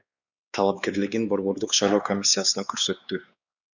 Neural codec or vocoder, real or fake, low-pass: codec, 16 kHz, 4 kbps, X-Codec, WavLM features, trained on Multilingual LibriSpeech; fake; 7.2 kHz